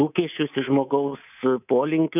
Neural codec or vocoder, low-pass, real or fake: codec, 24 kHz, 3.1 kbps, DualCodec; 3.6 kHz; fake